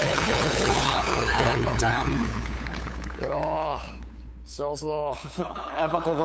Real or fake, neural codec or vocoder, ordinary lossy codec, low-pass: fake; codec, 16 kHz, 8 kbps, FunCodec, trained on LibriTTS, 25 frames a second; none; none